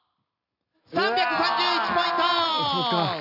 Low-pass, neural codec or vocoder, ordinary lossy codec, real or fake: 5.4 kHz; none; none; real